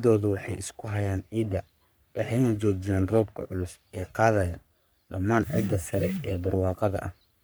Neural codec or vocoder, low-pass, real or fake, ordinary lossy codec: codec, 44.1 kHz, 3.4 kbps, Pupu-Codec; none; fake; none